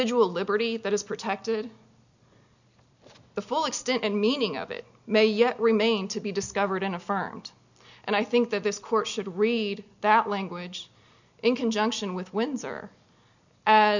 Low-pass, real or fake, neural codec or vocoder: 7.2 kHz; real; none